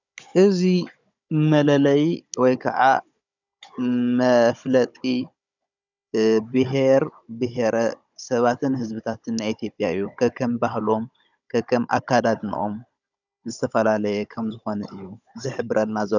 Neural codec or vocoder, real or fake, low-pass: codec, 16 kHz, 16 kbps, FunCodec, trained on Chinese and English, 50 frames a second; fake; 7.2 kHz